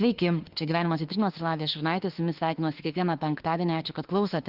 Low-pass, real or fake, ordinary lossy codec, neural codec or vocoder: 5.4 kHz; fake; Opus, 16 kbps; autoencoder, 48 kHz, 32 numbers a frame, DAC-VAE, trained on Japanese speech